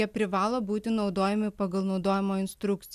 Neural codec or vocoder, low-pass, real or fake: none; 14.4 kHz; real